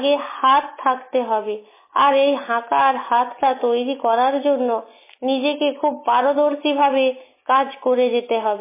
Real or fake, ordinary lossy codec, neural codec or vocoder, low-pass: real; MP3, 16 kbps; none; 3.6 kHz